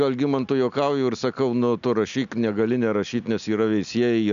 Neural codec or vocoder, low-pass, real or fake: none; 7.2 kHz; real